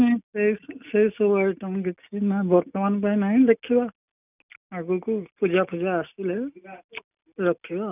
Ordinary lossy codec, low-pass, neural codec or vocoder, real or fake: none; 3.6 kHz; none; real